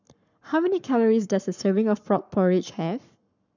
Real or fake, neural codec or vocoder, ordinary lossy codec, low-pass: fake; codec, 44.1 kHz, 7.8 kbps, Pupu-Codec; none; 7.2 kHz